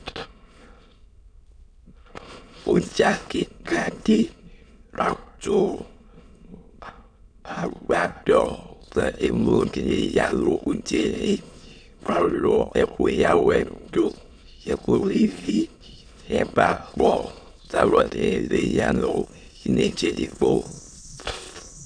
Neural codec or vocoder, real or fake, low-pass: autoencoder, 22.05 kHz, a latent of 192 numbers a frame, VITS, trained on many speakers; fake; 9.9 kHz